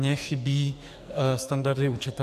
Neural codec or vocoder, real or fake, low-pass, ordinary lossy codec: codec, 44.1 kHz, 2.6 kbps, SNAC; fake; 14.4 kHz; AAC, 96 kbps